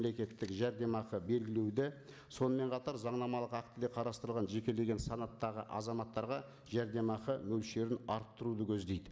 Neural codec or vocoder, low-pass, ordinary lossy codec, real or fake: none; none; none; real